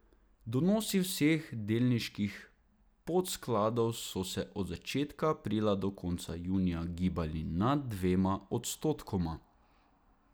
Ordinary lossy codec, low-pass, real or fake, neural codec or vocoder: none; none; real; none